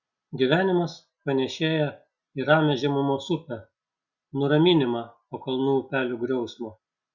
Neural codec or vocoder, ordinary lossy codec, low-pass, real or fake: none; Opus, 64 kbps; 7.2 kHz; real